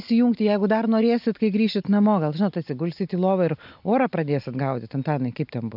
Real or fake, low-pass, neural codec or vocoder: real; 5.4 kHz; none